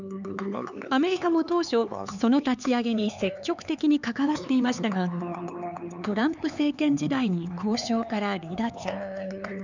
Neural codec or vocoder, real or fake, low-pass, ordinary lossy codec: codec, 16 kHz, 4 kbps, X-Codec, HuBERT features, trained on LibriSpeech; fake; 7.2 kHz; none